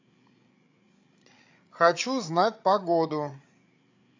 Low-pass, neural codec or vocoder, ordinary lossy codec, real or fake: 7.2 kHz; codec, 16 kHz, 8 kbps, FreqCodec, larger model; AAC, 48 kbps; fake